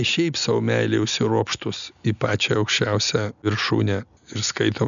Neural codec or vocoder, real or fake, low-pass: none; real; 7.2 kHz